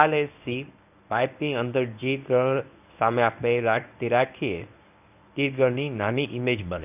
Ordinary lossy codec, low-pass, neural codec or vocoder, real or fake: none; 3.6 kHz; codec, 24 kHz, 0.9 kbps, WavTokenizer, medium speech release version 1; fake